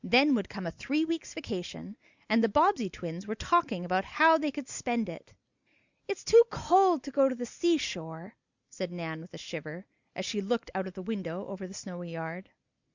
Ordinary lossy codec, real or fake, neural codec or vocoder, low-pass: Opus, 64 kbps; real; none; 7.2 kHz